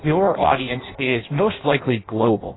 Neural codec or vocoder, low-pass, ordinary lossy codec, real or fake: codec, 16 kHz in and 24 kHz out, 0.6 kbps, FireRedTTS-2 codec; 7.2 kHz; AAC, 16 kbps; fake